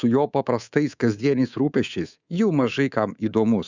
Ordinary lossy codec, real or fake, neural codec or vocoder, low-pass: Opus, 64 kbps; fake; codec, 24 kHz, 3.1 kbps, DualCodec; 7.2 kHz